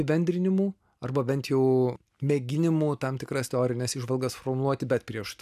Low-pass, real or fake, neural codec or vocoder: 14.4 kHz; real; none